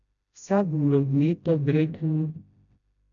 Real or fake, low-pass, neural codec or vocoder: fake; 7.2 kHz; codec, 16 kHz, 0.5 kbps, FreqCodec, smaller model